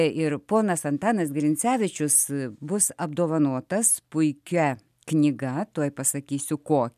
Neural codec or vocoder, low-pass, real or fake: none; 14.4 kHz; real